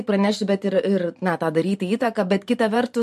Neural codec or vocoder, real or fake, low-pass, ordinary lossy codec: vocoder, 44.1 kHz, 128 mel bands every 512 samples, BigVGAN v2; fake; 14.4 kHz; MP3, 64 kbps